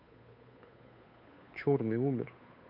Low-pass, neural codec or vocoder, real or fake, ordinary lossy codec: 5.4 kHz; codec, 16 kHz, 8 kbps, FunCodec, trained on Chinese and English, 25 frames a second; fake; none